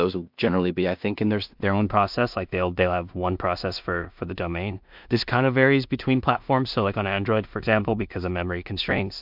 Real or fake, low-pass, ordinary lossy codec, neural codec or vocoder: fake; 5.4 kHz; MP3, 48 kbps; codec, 16 kHz in and 24 kHz out, 0.4 kbps, LongCat-Audio-Codec, two codebook decoder